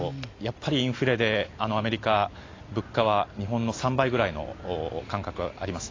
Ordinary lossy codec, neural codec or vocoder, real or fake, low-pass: AAC, 32 kbps; none; real; 7.2 kHz